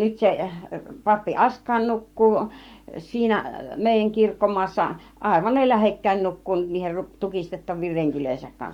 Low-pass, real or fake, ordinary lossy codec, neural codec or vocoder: 19.8 kHz; fake; Opus, 64 kbps; codec, 44.1 kHz, 7.8 kbps, Pupu-Codec